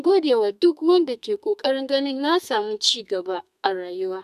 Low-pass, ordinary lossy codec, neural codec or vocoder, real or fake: 14.4 kHz; none; codec, 32 kHz, 1.9 kbps, SNAC; fake